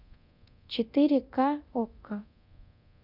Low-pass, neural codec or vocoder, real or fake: 5.4 kHz; codec, 24 kHz, 0.9 kbps, DualCodec; fake